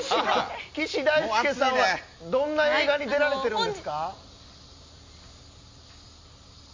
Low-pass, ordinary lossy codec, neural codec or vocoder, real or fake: 7.2 kHz; MP3, 48 kbps; none; real